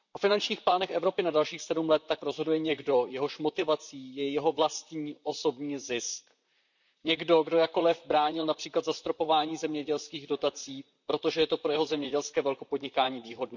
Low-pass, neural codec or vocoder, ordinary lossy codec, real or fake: 7.2 kHz; vocoder, 44.1 kHz, 128 mel bands, Pupu-Vocoder; none; fake